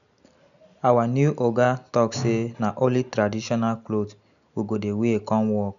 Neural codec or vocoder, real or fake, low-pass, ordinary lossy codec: none; real; 7.2 kHz; none